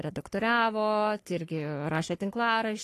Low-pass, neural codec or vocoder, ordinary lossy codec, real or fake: 14.4 kHz; codec, 44.1 kHz, 3.4 kbps, Pupu-Codec; AAC, 48 kbps; fake